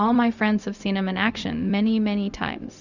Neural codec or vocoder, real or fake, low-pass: codec, 16 kHz, 0.4 kbps, LongCat-Audio-Codec; fake; 7.2 kHz